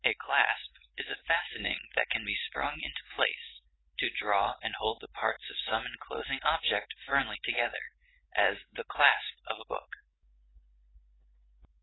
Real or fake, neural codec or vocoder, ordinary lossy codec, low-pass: real; none; AAC, 16 kbps; 7.2 kHz